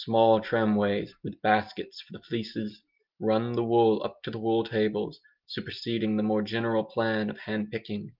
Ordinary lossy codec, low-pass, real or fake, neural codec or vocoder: Opus, 24 kbps; 5.4 kHz; real; none